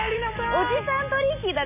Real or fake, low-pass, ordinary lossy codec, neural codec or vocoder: real; 3.6 kHz; AAC, 32 kbps; none